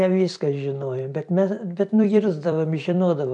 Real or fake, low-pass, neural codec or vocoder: fake; 10.8 kHz; vocoder, 44.1 kHz, 128 mel bands every 256 samples, BigVGAN v2